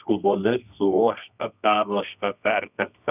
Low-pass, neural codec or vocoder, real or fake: 3.6 kHz; codec, 24 kHz, 0.9 kbps, WavTokenizer, medium music audio release; fake